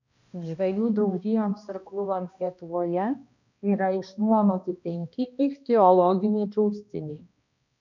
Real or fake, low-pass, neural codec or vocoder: fake; 7.2 kHz; codec, 16 kHz, 1 kbps, X-Codec, HuBERT features, trained on balanced general audio